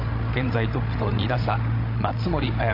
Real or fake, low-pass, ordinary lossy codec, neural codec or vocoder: fake; 5.4 kHz; AAC, 32 kbps; codec, 16 kHz, 16 kbps, FreqCodec, larger model